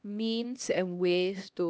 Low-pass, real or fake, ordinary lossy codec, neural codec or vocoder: none; fake; none; codec, 16 kHz, 1 kbps, X-Codec, HuBERT features, trained on LibriSpeech